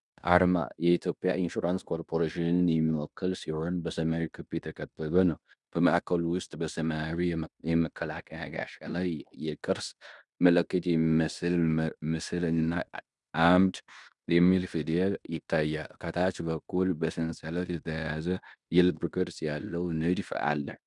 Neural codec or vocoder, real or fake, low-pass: codec, 16 kHz in and 24 kHz out, 0.9 kbps, LongCat-Audio-Codec, fine tuned four codebook decoder; fake; 10.8 kHz